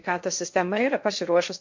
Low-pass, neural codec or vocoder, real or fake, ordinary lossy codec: 7.2 kHz; codec, 16 kHz in and 24 kHz out, 0.6 kbps, FocalCodec, streaming, 2048 codes; fake; MP3, 48 kbps